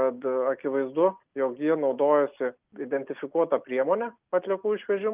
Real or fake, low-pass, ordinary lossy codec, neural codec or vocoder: real; 3.6 kHz; Opus, 24 kbps; none